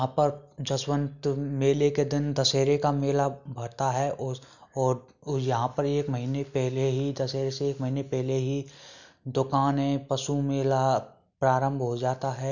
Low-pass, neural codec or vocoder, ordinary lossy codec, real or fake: 7.2 kHz; none; none; real